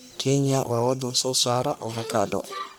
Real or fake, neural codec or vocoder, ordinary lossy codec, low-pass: fake; codec, 44.1 kHz, 1.7 kbps, Pupu-Codec; none; none